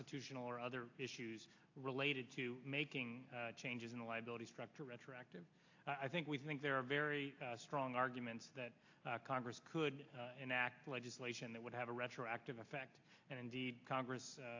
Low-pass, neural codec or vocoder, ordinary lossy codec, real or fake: 7.2 kHz; none; AAC, 48 kbps; real